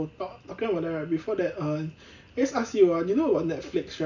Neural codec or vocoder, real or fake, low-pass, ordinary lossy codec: none; real; 7.2 kHz; none